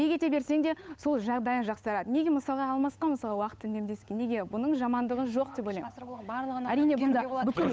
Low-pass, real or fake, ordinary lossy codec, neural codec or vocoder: none; fake; none; codec, 16 kHz, 8 kbps, FunCodec, trained on Chinese and English, 25 frames a second